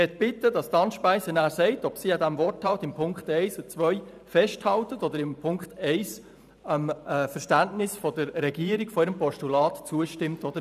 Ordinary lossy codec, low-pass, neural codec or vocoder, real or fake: none; 14.4 kHz; vocoder, 44.1 kHz, 128 mel bands every 512 samples, BigVGAN v2; fake